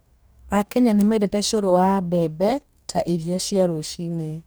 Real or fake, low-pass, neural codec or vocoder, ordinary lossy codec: fake; none; codec, 44.1 kHz, 2.6 kbps, DAC; none